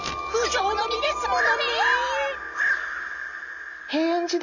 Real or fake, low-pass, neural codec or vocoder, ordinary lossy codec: real; 7.2 kHz; none; none